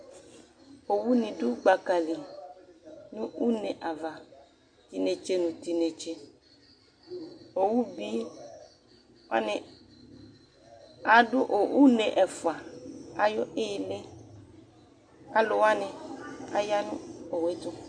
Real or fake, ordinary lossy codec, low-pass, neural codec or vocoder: real; MP3, 48 kbps; 9.9 kHz; none